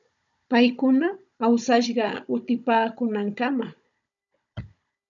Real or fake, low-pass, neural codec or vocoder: fake; 7.2 kHz; codec, 16 kHz, 16 kbps, FunCodec, trained on Chinese and English, 50 frames a second